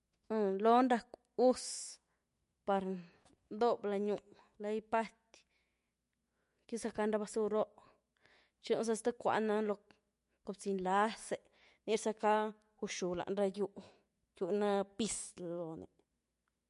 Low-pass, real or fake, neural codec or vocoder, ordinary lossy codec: 14.4 kHz; fake; autoencoder, 48 kHz, 128 numbers a frame, DAC-VAE, trained on Japanese speech; MP3, 48 kbps